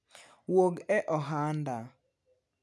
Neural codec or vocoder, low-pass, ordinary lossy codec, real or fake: none; none; none; real